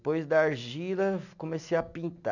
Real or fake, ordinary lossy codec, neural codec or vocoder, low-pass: real; none; none; 7.2 kHz